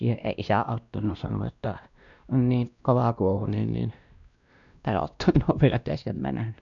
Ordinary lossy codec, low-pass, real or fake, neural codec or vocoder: none; 7.2 kHz; fake; codec, 16 kHz, 1 kbps, X-Codec, WavLM features, trained on Multilingual LibriSpeech